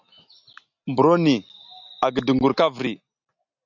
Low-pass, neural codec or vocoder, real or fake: 7.2 kHz; none; real